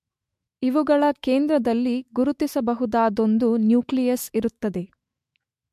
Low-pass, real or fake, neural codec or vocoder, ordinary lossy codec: 14.4 kHz; fake; autoencoder, 48 kHz, 128 numbers a frame, DAC-VAE, trained on Japanese speech; MP3, 64 kbps